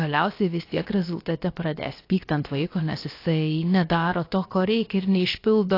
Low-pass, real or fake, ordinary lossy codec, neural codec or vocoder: 5.4 kHz; fake; AAC, 32 kbps; codec, 16 kHz, about 1 kbps, DyCAST, with the encoder's durations